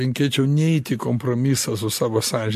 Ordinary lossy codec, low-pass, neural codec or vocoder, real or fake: MP3, 64 kbps; 14.4 kHz; none; real